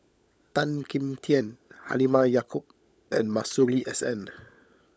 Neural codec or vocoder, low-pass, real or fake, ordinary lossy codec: codec, 16 kHz, 16 kbps, FunCodec, trained on LibriTTS, 50 frames a second; none; fake; none